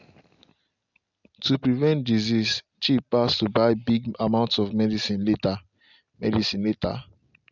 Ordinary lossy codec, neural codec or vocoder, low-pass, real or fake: none; none; 7.2 kHz; real